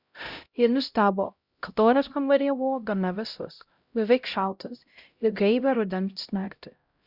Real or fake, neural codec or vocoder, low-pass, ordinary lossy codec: fake; codec, 16 kHz, 0.5 kbps, X-Codec, HuBERT features, trained on LibriSpeech; 5.4 kHz; Opus, 64 kbps